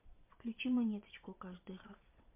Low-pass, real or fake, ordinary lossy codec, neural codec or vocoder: 3.6 kHz; fake; MP3, 32 kbps; vocoder, 22.05 kHz, 80 mel bands, Vocos